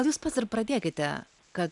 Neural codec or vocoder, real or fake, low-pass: none; real; 10.8 kHz